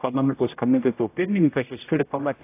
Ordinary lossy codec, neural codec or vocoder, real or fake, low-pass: AAC, 24 kbps; codec, 16 kHz, 0.5 kbps, X-Codec, HuBERT features, trained on general audio; fake; 3.6 kHz